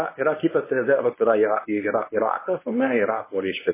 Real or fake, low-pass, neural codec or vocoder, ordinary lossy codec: fake; 3.6 kHz; codec, 24 kHz, 0.9 kbps, WavTokenizer, medium speech release version 1; MP3, 16 kbps